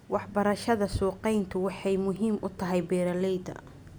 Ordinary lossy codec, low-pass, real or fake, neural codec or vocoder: none; none; real; none